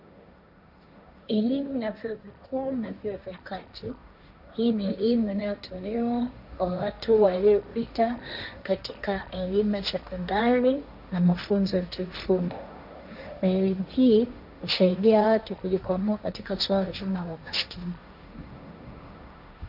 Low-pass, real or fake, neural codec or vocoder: 5.4 kHz; fake; codec, 16 kHz, 1.1 kbps, Voila-Tokenizer